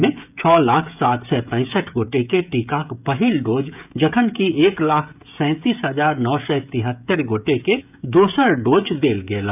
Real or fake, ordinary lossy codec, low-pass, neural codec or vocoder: fake; none; 3.6 kHz; codec, 16 kHz, 16 kbps, FreqCodec, smaller model